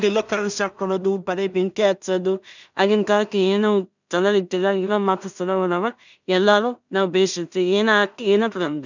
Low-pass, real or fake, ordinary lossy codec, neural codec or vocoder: 7.2 kHz; fake; none; codec, 16 kHz in and 24 kHz out, 0.4 kbps, LongCat-Audio-Codec, two codebook decoder